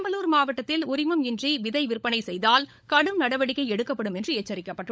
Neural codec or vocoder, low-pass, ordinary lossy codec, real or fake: codec, 16 kHz, 16 kbps, FunCodec, trained on LibriTTS, 50 frames a second; none; none; fake